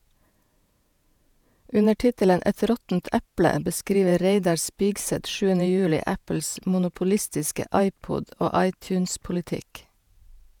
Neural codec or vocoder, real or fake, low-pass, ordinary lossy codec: vocoder, 48 kHz, 128 mel bands, Vocos; fake; 19.8 kHz; none